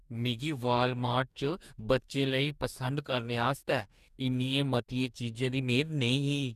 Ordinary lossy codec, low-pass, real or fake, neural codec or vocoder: none; 14.4 kHz; fake; codec, 44.1 kHz, 2.6 kbps, DAC